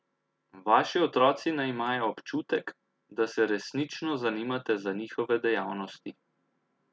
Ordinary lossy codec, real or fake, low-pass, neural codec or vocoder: none; real; none; none